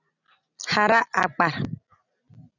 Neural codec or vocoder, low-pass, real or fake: none; 7.2 kHz; real